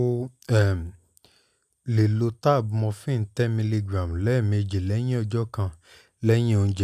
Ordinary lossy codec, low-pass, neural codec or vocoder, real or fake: none; 14.4 kHz; none; real